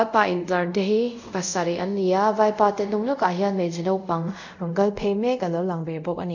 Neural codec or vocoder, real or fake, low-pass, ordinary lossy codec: codec, 24 kHz, 0.5 kbps, DualCodec; fake; 7.2 kHz; none